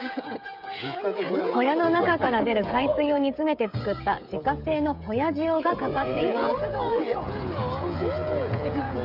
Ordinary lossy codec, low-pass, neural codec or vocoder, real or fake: none; 5.4 kHz; codec, 16 kHz, 16 kbps, FreqCodec, smaller model; fake